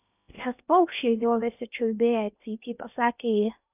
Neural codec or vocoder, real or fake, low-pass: codec, 16 kHz in and 24 kHz out, 0.8 kbps, FocalCodec, streaming, 65536 codes; fake; 3.6 kHz